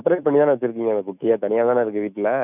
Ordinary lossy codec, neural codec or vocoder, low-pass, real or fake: none; autoencoder, 48 kHz, 128 numbers a frame, DAC-VAE, trained on Japanese speech; 3.6 kHz; fake